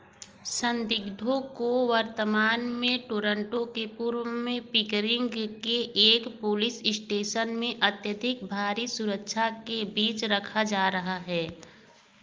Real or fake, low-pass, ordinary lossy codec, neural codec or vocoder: real; 7.2 kHz; Opus, 24 kbps; none